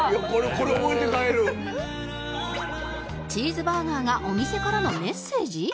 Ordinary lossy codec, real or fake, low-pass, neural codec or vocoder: none; real; none; none